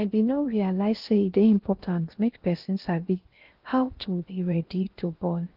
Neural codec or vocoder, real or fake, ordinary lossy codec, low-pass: codec, 16 kHz in and 24 kHz out, 0.6 kbps, FocalCodec, streaming, 2048 codes; fake; Opus, 16 kbps; 5.4 kHz